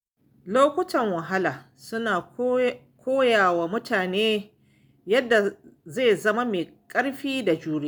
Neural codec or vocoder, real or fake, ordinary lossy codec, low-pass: none; real; none; none